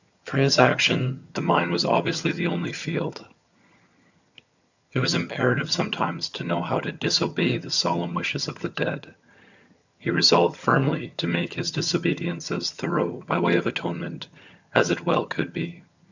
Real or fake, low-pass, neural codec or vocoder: fake; 7.2 kHz; vocoder, 22.05 kHz, 80 mel bands, HiFi-GAN